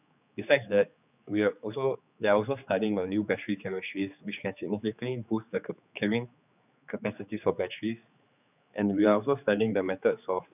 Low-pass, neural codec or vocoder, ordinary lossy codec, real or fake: 3.6 kHz; codec, 16 kHz, 4 kbps, X-Codec, HuBERT features, trained on general audio; none; fake